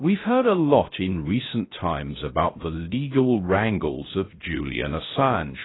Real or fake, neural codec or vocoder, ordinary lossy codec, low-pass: fake; codec, 16 kHz, 0.3 kbps, FocalCodec; AAC, 16 kbps; 7.2 kHz